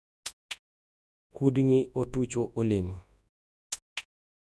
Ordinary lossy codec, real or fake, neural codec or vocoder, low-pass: none; fake; codec, 24 kHz, 0.9 kbps, WavTokenizer, large speech release; none